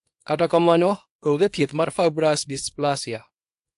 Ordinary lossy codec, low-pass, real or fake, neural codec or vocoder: AAC, 64 kbps; 10.8 kHz; fake; codec, 24 kHz, 0.9 kbps, WavTokenizer, small release